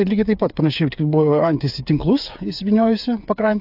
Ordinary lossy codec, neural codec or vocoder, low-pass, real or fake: AAC, 48 kbps; codec, 16 kHz, 8 kbps, FreqCodec, smaller model; 5.4 kHz; fake